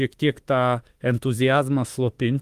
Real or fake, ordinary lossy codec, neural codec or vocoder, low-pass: fake; Opus, 24 kbps; autoencoder, 48 kHz, 32 numbers a frame, DAC-VAE, trained on Japanese speech; 14.4 kHz